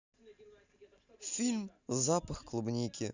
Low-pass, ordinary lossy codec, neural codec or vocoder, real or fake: 7.2 kHz; Opus, 64 kbps; none; real